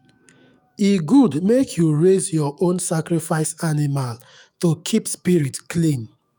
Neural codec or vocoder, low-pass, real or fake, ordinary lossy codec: autoencoder, 48 kHz, 128 numbers a frame, DAC-VAE, trained on Japanese speech; none; fake; none